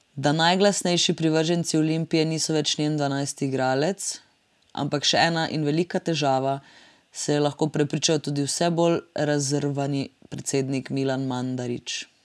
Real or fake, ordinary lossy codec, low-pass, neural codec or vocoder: real; none; none; none